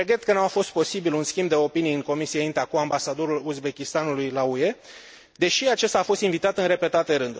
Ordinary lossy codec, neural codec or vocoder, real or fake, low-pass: none; none; real; none